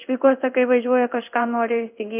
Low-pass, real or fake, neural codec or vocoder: 3.6 kHz; fake; codec, 16 kHz in and 24 kHz out, 1 kbps, XY-Tokenizer